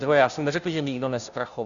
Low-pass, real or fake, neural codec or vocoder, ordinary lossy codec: 7.2 kHz; fake; codec, 16 kHz, 0.5 kbps, FunCodec, trained on Chinese and English, 25 frames a second; MP3, 96 kbps